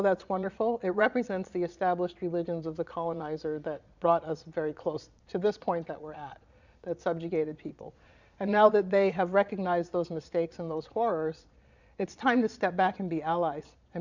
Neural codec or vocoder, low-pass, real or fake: vocoder, 22.05 kHz, 80 mel bands, Vocos; 7.2 kHz; fake